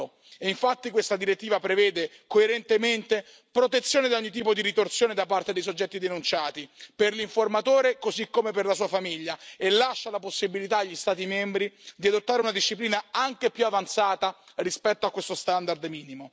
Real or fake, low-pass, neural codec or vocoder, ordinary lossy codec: real; none; none; none